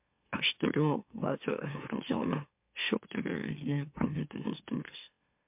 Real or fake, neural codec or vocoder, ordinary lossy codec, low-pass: fake; autoencoder, 44.1 kHz, a latent of 192 numbers a frame, MeloTTS; MP3, 32 kbps; 3.6 kHz